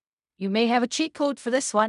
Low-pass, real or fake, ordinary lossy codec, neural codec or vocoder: 10.8 kHz; fake; none; codec, 16 kHz in and 24 kHz out, 0.4 kbps, LongCat-Audio-Codec, fine tuned four codebook decoder